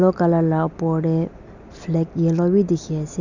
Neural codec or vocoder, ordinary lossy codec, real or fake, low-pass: none; none; real; 7.2 kHz